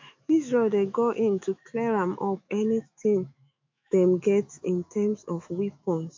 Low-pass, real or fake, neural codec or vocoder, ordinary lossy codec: 7.2 kHz; fake; autoencoder, 48 kHz, 128 numbers a frame, DAC-VAE, trained on Japanese speech; MP3, 48 kbps